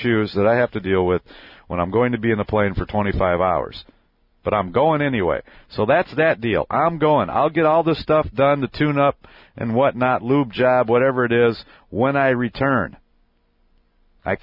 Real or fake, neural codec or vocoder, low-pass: real; none; 5.4 kHz